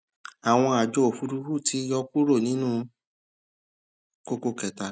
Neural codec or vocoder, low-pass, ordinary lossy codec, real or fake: none; none; none; real